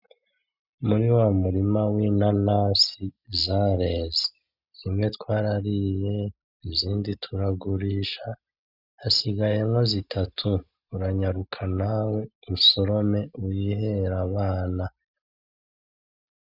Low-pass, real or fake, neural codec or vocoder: 5.4 kHz; real; none